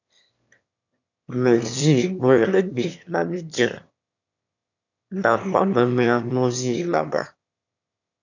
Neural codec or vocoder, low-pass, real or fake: autoencoder, 22.05 kHz, a latent of 192 numbers a frame, VITS, trained on one speaker; 7.2 kHz; fake